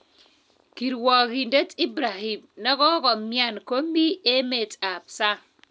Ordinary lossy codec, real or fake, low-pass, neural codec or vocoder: none; real; none; none